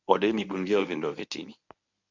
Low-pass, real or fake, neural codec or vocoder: 7.2 kHz; fake; codec, 24 kHz, 0.9 kbps, WavTokenizer, medium speech release version 1